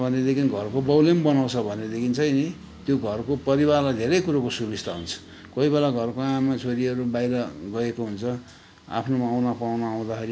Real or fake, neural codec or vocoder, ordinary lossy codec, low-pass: real; none; none; none